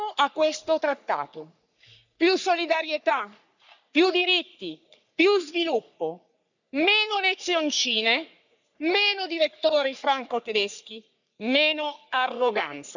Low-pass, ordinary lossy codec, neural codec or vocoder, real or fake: 7.2 kHz; none; codec, 44.1 kHz, 3.4 kbps, Pupu-Codec; fake